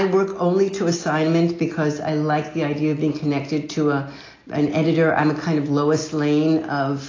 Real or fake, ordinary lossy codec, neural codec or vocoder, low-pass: real; AAC, 32 kbps; none; 7.2 kHz